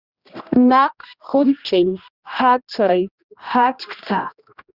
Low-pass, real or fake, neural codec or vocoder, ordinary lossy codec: 5.4 kHz; fake; codec, 16 kHz, 1 kbps, X-Codec, HuBERT features, trained on general audio; Opus, 64 kbps